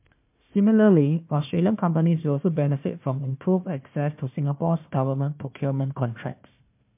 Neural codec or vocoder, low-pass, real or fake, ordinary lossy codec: codec, 16 kHz, 1 kbps, FunCodec, trained on Chinese and English, 50 frames a second; 3.6 kHz; fake; MP3, 32 kbps